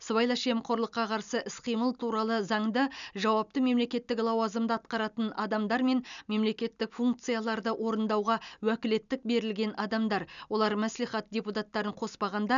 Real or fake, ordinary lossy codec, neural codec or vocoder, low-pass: real; none; none; 7.2 kHz